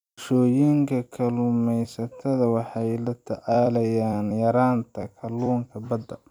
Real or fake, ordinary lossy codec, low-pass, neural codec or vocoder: fake; none; 19.8 kHz; vocoder, 44.1 kHz, 128 mel bands every 256 samples, BigVGAN v2